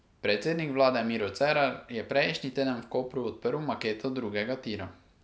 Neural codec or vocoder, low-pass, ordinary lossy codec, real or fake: none; none; none; real